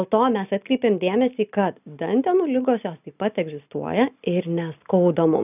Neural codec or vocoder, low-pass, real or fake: none; 3.6 kHz; real